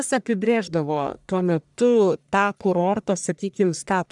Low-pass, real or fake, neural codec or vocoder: 10.8 kHz; fake; codec, 44.1 kHz, 1.7 kbps, Pupu-Codec